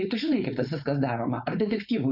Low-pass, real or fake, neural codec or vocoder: 5.4 kHz; fake; codec, 16 kHz, 4.8 kbps, FACodec